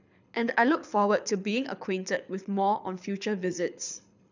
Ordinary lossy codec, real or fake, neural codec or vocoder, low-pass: none; fake; codec, 24 kHz, 6 kbps, HILCodec; 7.2 kHz